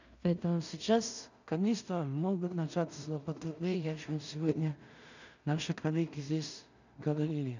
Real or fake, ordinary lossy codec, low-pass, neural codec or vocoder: fake; none; 7.2 kHz; codec, 16 kHz in and 24 kHz out, 0.4 kbps, LongCat-Audio-Codec, two codebook decoder